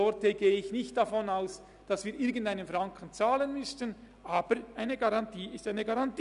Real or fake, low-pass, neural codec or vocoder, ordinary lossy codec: real; 10.8 kHz; none; none